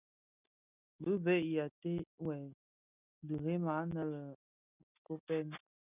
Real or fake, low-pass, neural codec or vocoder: real; 3.6 kHz; none